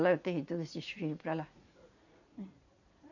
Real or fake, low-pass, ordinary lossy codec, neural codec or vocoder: fake; 7.2 kHz; none; vocoder, 44.1 kHz, 80 mel bands, Vocos